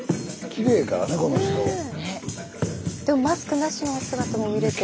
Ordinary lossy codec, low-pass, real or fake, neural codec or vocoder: none; none; real; none